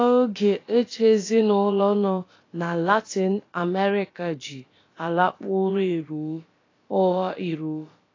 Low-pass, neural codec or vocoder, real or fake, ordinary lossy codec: 7.2 kHz; codec, 16 kHz, about 1 kbps, DyCAST, with the encoder's durations; fake; AAC, 32 kbps